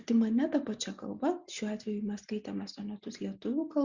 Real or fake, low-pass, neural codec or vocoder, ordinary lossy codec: real; 7.2 kHz; none; Opus, 64 kbps